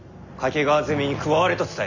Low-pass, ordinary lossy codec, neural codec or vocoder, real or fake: 7.2 kHz; none; none; real